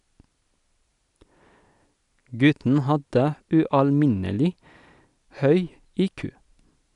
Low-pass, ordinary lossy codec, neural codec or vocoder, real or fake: 10.8 kHz; none; none; real